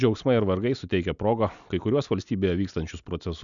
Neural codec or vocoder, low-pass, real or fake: none; 7.2 kHz; real